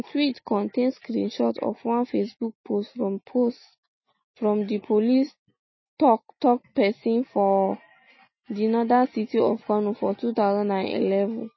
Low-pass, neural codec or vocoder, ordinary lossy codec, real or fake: 7.2 kHz; none; MP3, 24 kbps; real